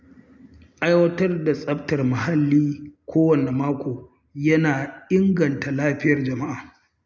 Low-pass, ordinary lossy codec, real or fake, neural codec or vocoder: none; none; real; none